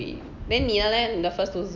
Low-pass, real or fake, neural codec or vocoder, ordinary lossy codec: 7.2 kHz; real; none; none